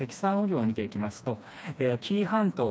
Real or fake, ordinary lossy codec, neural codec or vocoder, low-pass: fake; none; codec, 16 kHz, 2 kbps, FreqCodec, smaller model; none